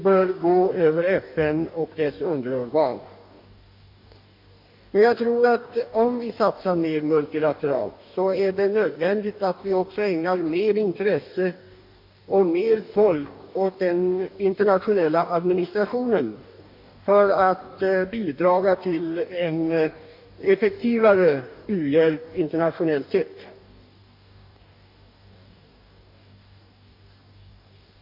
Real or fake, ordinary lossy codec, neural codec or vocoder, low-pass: fake; MP3, 48 kbps; codec, 44.1 kHz, 2.6 kbps, DAC; 5.4 kHz